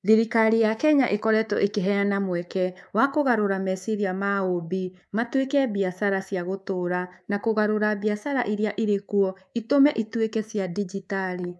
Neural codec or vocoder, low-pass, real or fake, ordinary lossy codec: codec, 24 kHz, 3.1 kbps, DualCodec; none; fake; none